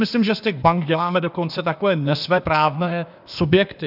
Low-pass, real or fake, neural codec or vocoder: 5.4 kHz; fake; codec, 16 kHz, 0.8 kbps, ZipCodec